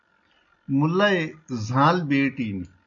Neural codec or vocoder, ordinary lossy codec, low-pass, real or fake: none; MP3, 64 kbps; 7.2 kHz; real